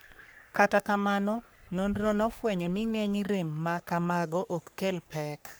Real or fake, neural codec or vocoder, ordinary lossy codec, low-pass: fake; codec, 44.1 kHz, 3.4 kbps, Pupu-Codec; none; none